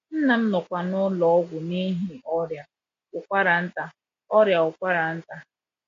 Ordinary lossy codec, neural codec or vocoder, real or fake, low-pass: AAC, 48 kbps; none; real; 7.2 kHz